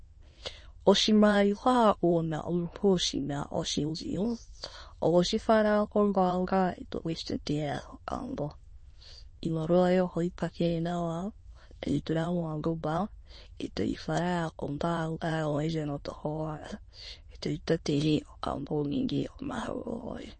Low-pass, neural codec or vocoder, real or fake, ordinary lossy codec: 9.9 kHz; autoencoder, 22.05 kHz, a latent of 192 numbers a frame, VITS, trained on many speakers; fake; MP3, 32 kbps